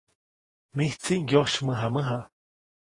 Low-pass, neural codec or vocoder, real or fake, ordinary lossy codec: 10.8 kHz; vocoder, 48 kHz, 128 mel bands, Vocos; fake; AAC, 32 kbps